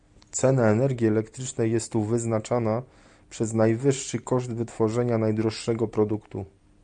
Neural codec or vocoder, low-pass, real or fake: none; 9.9 kHz; real